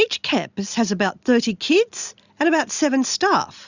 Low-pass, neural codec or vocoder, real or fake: 7.2 kHz; none; real